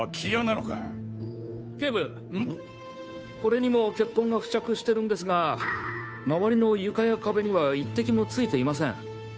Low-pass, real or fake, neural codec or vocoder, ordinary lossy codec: none; fake; codec, 16 kHz, 2 kbps, FunCodec, trained on Chinese and English, 25 frames a second; none